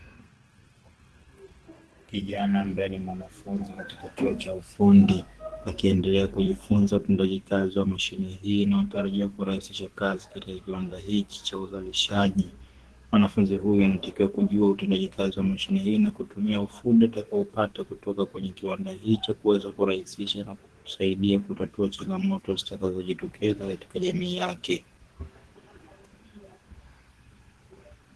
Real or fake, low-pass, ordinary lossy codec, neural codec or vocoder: fake; 10.8 kHz; Opus, 16 kbps; codec, 32 kHz, 1.9 kbps, SNAC